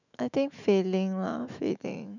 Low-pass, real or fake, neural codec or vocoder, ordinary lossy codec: 7.2 kHz; fake; autoencoder, 48 kHz, 128 numbers a frame, DAC-VAE, trained on Japanese speech; none